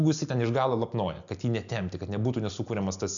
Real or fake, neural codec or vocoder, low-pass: real; none; 7.2 kHz